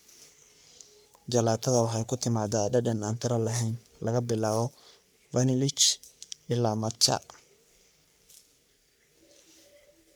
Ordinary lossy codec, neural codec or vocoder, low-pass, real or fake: none; codec, 44.1 kHz, 3.4 kbps, Pupu-Codec; none; fake